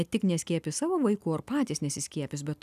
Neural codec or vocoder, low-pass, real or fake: none; 14.4 kHz; real